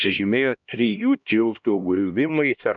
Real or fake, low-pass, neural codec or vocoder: fake; 7.2 kHz; codec, 16 kHz, 1 kbps, X-Codec, HuBERT features, trained on LibriSpeech